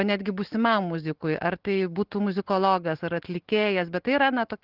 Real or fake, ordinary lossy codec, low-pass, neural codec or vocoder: real; Opus, 16 kbps; 5.4 kHz; none